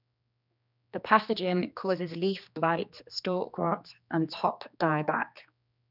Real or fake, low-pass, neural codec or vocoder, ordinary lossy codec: fake; 5.4 kHz; codec, 16 kHz, 2 kbps, X-Codec, HuBERT features, trained on general audio; none